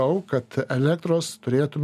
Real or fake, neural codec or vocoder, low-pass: real; none; 14.4 kHz